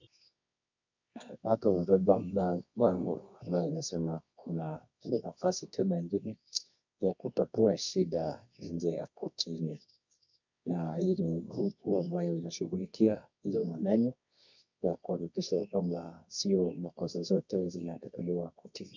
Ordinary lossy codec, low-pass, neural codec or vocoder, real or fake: MP3, 64 kbps; 7.2 kHz; codec, 24 kHz, 0.9 kbps, WavTokenizer, medium music audio release; fake